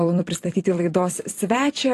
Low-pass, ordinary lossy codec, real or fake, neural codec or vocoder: 14.4 kHz; AAC, 48 kbps; fake; vocoder, 44.1 kHz, 128 mel bands every 256 samples, BigVGAN v2